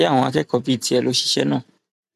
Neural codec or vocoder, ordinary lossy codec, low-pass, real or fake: vocoder, 44.1 kHz, 128 mel bands every 512 samples, BigVGAN v2; none; 14.4 kHz; fake